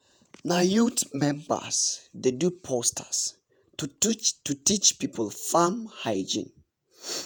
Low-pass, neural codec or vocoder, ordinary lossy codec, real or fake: none; vocoder, 48 kHz, 128 mel bands, Vocos; none; fake